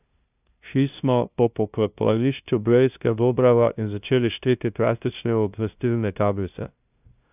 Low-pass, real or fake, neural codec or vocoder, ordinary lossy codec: 3.6 kHz; fake; codec, 16 kHz, 0.5 kbps, FunCodec, trained on LibriTTS, 25 frames a second; none